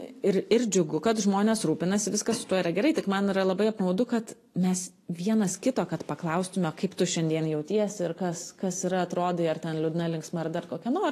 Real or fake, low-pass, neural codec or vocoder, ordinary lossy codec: real; 14.4 kHz; none; AAC, 48 kbps